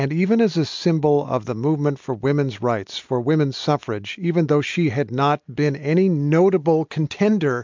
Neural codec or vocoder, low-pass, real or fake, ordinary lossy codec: none; 7.2 kHz; real; MP3, 64 kbps